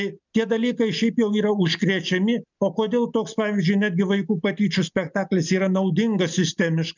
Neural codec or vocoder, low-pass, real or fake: none; 7.2 kHz; real